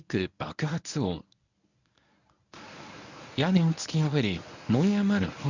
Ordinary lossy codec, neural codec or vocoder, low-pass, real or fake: none; codec, 24 kHz, 0.9 kbps, WavTokenizer, medium speech release version 1; 7.2 kHz; fake